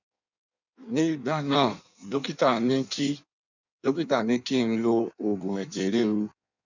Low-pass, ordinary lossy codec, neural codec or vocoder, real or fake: 7.2 kHz; none; codec, 16 kHz in and 24 kHz out, 1.1 kbps, FireRedTTS-2 codec; fake